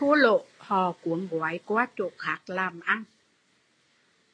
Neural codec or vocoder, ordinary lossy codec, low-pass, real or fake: none; AAC, 32 kbps; 9.9 kHz; real